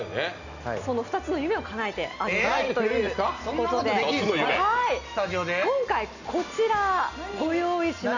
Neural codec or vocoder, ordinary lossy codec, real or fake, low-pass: none; none; real; 7.2 kHz